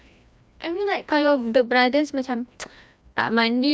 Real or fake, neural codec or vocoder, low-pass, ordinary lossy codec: fake; codec, 16 kHz, 1 kbps, FreqCodec, larger model; none; none